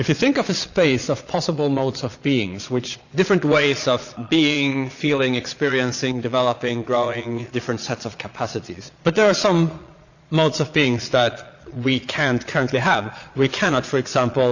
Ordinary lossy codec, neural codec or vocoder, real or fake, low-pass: AAC, 48 kbps; vocoder, 22.05 kHz, 80 mel bands, Vocos; fake; 7.2 kHz